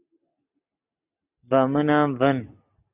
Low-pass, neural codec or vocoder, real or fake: 3.6 kHz; none; real